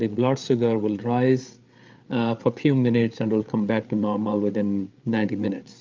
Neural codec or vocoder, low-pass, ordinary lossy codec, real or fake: codec, 16 kHz, 8 kbps, FreqCodec, larger model; 7.2 kHz; Opus, 24 kbps; fake